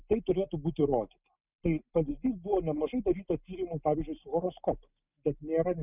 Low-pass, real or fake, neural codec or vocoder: 3.6 kHz; real; none